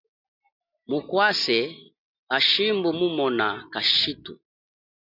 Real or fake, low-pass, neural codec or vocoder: real; 5.4 kHz; none